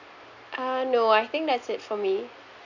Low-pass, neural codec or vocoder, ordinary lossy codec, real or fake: 7.2 kHz; none; none; real